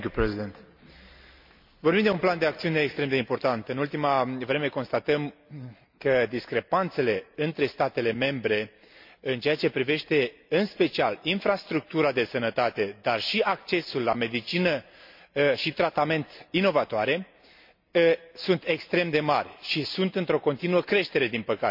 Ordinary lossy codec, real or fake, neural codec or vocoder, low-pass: MP3, 48 kbps; real; none; 5.4 kHz